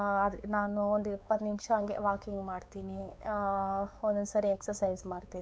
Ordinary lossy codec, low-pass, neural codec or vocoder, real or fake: none; none; codec, 16 kHz, 4 kbps, X-Codec, WavLM features, trained on Multilingual LibriSpeech; fake